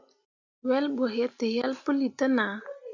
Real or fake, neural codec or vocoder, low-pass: real; none; 7.2 kHz